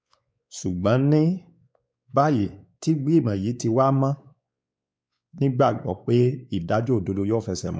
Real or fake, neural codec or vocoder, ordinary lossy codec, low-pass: fake; codec, 16 kHz, 4 kbps, X-Codec, WavLM features, trained on Multilingual LibriSpeech; none; none